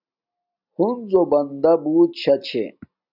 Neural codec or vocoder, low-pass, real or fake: none; 5.4 kHz; real